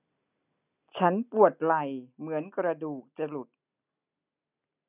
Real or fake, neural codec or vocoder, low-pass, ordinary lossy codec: real; none; 3.6 kHz; none